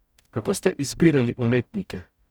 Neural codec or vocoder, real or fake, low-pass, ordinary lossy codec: codec, 44.1 kHz, 0.9 kbps, DAC; fake; none; none